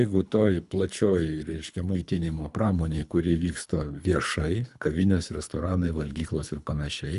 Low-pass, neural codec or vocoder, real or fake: 10.8 kHz; codec, 24 kHz, 3 kbps, HILCodec; fake